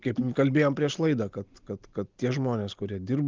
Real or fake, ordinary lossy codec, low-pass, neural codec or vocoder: real; Opus, 32 kbps; 7.2 kHz; none